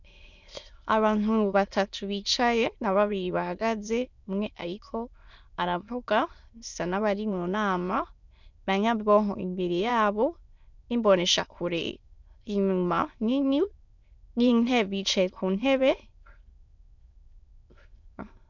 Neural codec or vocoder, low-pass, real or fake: autoencoder, 22.05 kHz, a latent of 192 numbers a frame, VITS, trained on many speakers; 7.2 kHz; fake